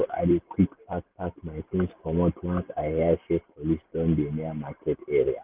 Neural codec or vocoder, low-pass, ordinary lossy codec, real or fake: none; 3.6 kHz; Opus, 32 kbps; real